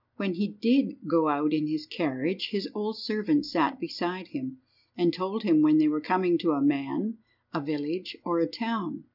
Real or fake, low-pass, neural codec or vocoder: real; 5.4 kHz; none